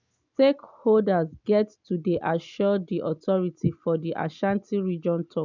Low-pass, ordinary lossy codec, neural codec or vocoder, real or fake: 7.2 kHz; none; none; real